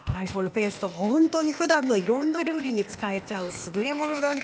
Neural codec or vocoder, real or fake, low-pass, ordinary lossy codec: codec, 16 kHz, 0.8 kbps, ZipCodec; fake; none; none